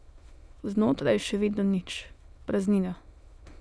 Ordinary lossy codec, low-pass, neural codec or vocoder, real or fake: none; none; autoencoder, 22.05 kHz, a latent of 192 numbers a frame, VITS, trained on many speakers; fake